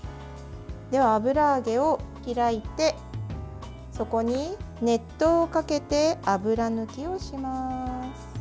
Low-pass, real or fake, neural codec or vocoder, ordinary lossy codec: none; real; none; none